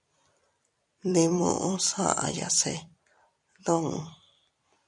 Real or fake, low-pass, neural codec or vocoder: fake; 10.8 kHz; vocoder, 44.1 kHz, 128 mel bands every 256 samples, BigVGAN v2